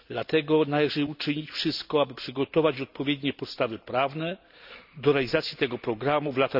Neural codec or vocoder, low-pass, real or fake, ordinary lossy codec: none; 5.4 kHz; real; none